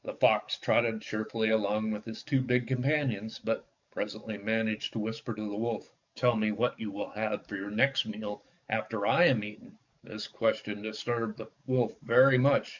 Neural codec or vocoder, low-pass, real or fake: codec, 44.1 kHz, 7.8 kbps, DAC; 7.2 kHz; fake